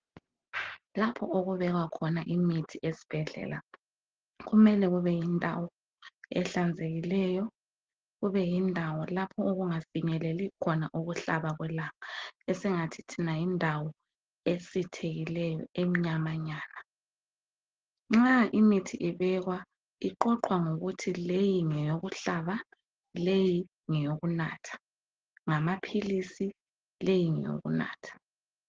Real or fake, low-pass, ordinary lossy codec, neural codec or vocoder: real; 7.2 kHz; Opus, 16 kbps; none